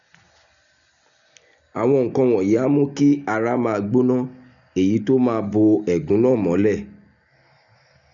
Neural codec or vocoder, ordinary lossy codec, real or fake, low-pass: none; none; real; 7.2 kHz